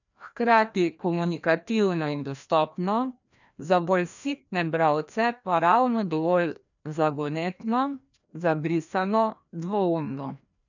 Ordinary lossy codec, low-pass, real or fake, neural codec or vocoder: none; 7.2 kHz; fake; codec, 16 kHz, 1 kbps, FreqCodec, larger model